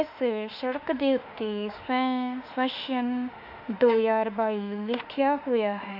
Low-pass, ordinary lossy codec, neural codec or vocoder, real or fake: 5.4 kHz; none; autoencoder, 48 kHz, 32 numbers a frame, DAC-VAE, trained on Japanese speech; fake